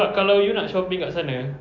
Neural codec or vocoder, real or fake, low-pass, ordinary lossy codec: none; real; 7.2 kHz; MP3, 48 kbps